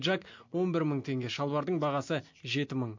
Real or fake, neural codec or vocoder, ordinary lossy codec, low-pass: real; none; MP3, 48 kbps; 7.2 kHz